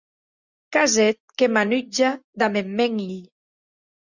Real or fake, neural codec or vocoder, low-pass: real; none; 7.2 kHz